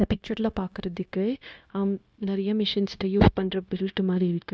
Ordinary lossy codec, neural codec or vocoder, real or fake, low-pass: none; codec, 16 kHz, 0.9 kbps, LongCat-Audio-Codec; fake; none